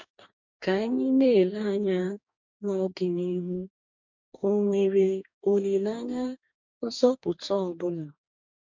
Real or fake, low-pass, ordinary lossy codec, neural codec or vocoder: fake; 7.2 kHz; MP3, 64 kbps; codec, 44.1 kHz, 2.6 kbps, DAC